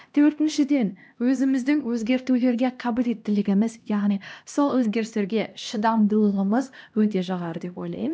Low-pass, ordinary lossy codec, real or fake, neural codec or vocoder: none; none; fake; codec, 16 kHz, 1 kbps, X-Codec, HuBERT features, trained on LibriSpeech